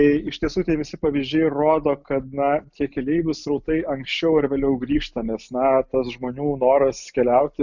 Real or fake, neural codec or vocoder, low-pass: real; none; 7.2 kHz